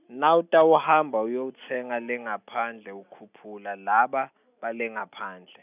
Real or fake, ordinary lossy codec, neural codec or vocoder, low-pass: real; none; none; 3.6 kHz